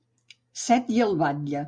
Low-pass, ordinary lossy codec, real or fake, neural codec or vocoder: 9.9 kHz; Opus, 64 kbps; real; none